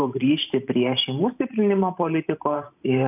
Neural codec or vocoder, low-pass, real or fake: vocoder, 44.1 kHz, 128 mel bands every 512 samples, BigVGAN v2; 3.6 kHz; fake